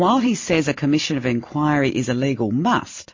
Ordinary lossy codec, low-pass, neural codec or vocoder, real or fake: MP3, 32 kbps; 7.2 kHz; none; real